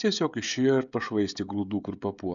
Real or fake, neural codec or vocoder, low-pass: fake; codec, 16 kHz, 16 kbps, FreqCodec, smaller model; 7.2 kHz